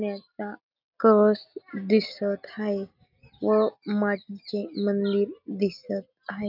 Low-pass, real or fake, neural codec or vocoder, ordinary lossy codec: 5.4 kHz; real; none; none